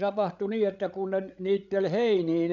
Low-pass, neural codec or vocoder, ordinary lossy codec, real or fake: 7.2 kHz; codec, 16 kHz, 8 kbps, FreqCodec, larger model; none; fake